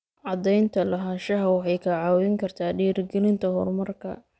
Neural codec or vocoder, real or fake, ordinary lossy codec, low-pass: none; real; none; none